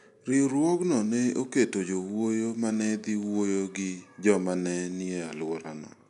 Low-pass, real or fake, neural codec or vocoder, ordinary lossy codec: 10.8 kHz; real; none; none